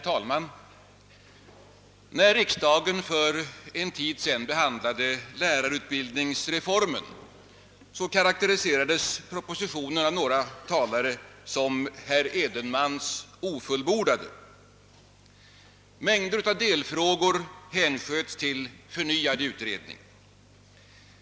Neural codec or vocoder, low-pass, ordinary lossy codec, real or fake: none; none; none; real